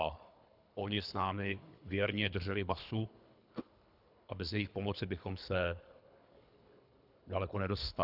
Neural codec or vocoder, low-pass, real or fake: codec, 24 kHz, 3 kbps, HILCodec; 5.4 kHz; fake